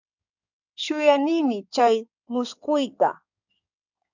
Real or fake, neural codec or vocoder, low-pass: fake; codec, 16 kHz in and 24 kHz out, 2.2 kbps, FireRedTTS-2 codec; 7.2 kHz